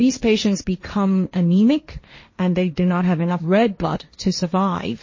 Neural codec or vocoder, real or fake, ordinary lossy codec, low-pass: codec, 16 kHz, 1.1 kbps, Voila-Tokenizer; fake; MP3, 32 kbps; 7.2 kHz